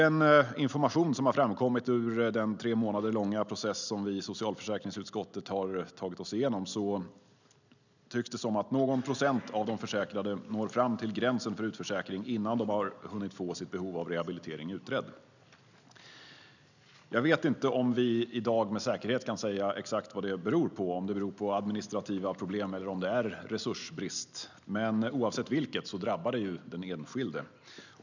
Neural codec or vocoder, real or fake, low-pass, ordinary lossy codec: none; real; 7.2 kHz; none